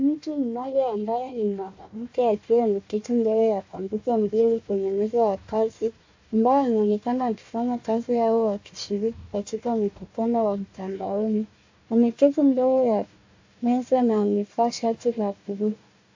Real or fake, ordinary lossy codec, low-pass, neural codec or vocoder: fake; AAC, 48 kbps; 7.2 kHz; codec, 16 kHz, 1 kbps, FunCodec, trained on Chinese and English, 50 frames a second